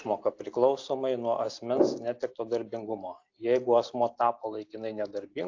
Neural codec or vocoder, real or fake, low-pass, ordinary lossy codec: none; real; 7.2 kHz; AAC, 48 kbps